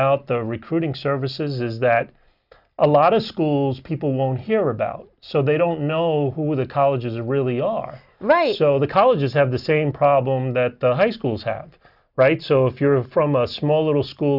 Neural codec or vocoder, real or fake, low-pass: none; real; 5.4 kHz